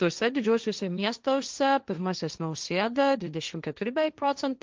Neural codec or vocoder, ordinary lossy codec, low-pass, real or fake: codec, 16 kHz, 1.1 kbps, Voila-Tokenizer; Opus, 32 kbps; 7.2 kHz; fake